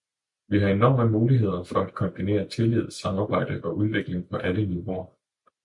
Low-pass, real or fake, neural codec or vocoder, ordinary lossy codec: 10.8 kHz; real; none; MP3, 96 kbps